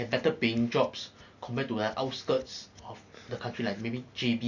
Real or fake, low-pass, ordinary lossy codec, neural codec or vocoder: real; 7.2 kHz; none; none